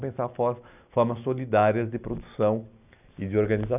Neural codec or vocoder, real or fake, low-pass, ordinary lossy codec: none; real; 3.6 kHz; none